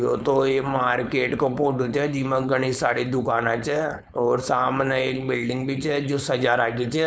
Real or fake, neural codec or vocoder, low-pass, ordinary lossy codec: fake; codec, 16 kHz, 4.8 kbps, FACodec; none; none